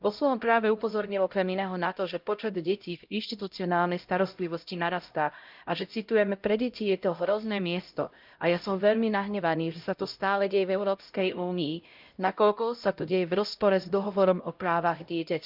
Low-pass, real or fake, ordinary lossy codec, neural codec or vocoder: 5.4 kHz; fake; Opus, 24 kbps; codec, 16 kHz, 0.5 kbps, X-Codec, HuBERT features, trained on LibriSpeech